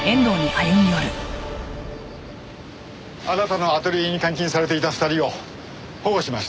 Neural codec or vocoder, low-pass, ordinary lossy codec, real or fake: none; none; none; real